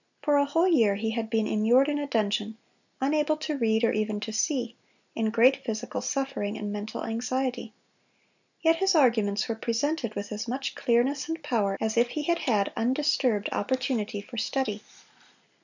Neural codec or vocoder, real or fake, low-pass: none; real; 7.2 kHz